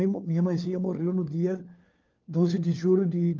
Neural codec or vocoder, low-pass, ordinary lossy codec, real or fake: codec, 16 kHz, 4 kbps, FunCodec, trained on LibriTTS, 50 frames a second; 7.2 kHz; Opus, 32 kbps; fake